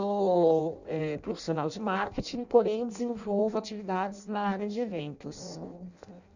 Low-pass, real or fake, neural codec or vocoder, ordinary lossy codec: 7.2 kHz; fake; codec, 16 kHz in and 24 kHz out, 0.6 kbps, FireRedTTS-2 codec; none